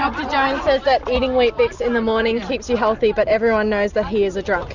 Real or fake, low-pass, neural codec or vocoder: real; 7.2 kHz; none